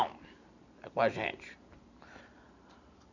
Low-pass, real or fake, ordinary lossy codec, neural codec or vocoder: 7.2 kHz; real; none; none